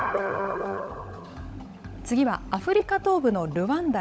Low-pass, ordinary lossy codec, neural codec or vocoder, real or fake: none; none; codec, 16 kHz, 16 kbps, FunCodec, trained on LibriTTS, 50 frames a second; fake